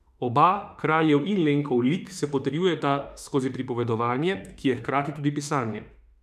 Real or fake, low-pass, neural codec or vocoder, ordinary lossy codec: fake; 14.4 kHz; autoencoder, 48 kHz, 32 numbers a frame, DAC-VAE, trained on Japanese speech; none